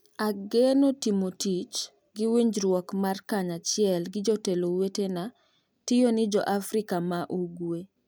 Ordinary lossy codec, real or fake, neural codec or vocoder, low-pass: none; real; none; none